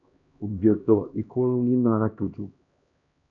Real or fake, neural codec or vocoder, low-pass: fake; codec, 16 kHz, 1 kbps, X-Codec, HuBERT features, trained on LibriSpeech; 7.2 kHz